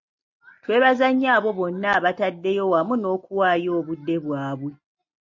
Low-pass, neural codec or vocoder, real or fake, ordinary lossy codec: 7.2 kHz; none; real; MP3, 48 kbps